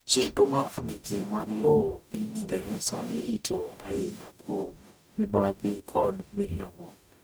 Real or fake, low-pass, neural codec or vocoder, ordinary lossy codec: fake; none; codec, 44.1 kHz, 0.9 kbps, DAC; none